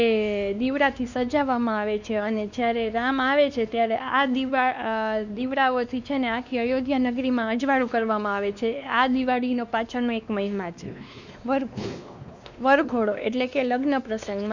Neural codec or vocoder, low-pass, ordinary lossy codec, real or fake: codec, 16 kHz, 2 kbps, X-Codec, WavLM features, trained on Multilingual LibriSpeech; 7.2 kHz; none; fake